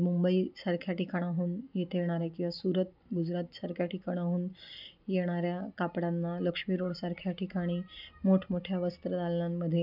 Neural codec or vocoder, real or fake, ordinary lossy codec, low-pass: none; real; none; 5.4 kHz